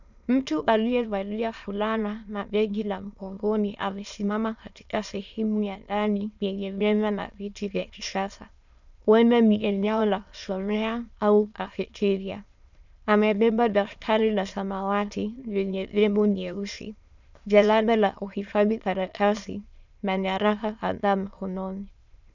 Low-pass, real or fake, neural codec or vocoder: 7.2 kHz; fake; autoencoder, 22.05 kHz, a latent of 192 numbers a frame, VITS, trained on many speakers